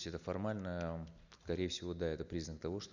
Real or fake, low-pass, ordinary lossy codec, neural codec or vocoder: real; 7.2 kHz; none; none